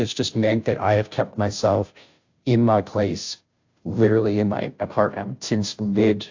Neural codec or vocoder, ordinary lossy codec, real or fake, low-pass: codec, 16 kHz, 0.5 kbps, FunCodec, trained on Chinese and English, 25 frames a second; AAC, 48 kbps; fake; 7.2 kHz